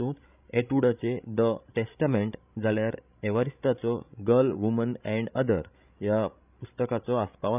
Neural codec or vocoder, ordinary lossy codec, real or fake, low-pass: codec, 16 kHz, 16 kbps, FreqCodec, larger model; none; fake; 3.6 kHz